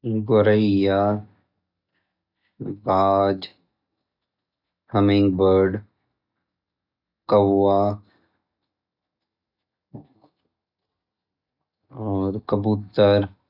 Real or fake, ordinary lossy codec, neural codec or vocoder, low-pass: real; none; none; 5.4 kHz